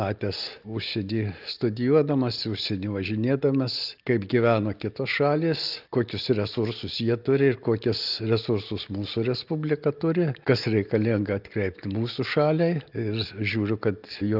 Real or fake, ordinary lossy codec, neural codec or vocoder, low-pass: real; Opus, 32 kbps; none; 5.4 kHz